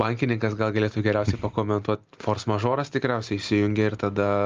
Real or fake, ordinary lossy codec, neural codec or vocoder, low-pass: real; Opus, 24 kbps; none; 7.2 kHz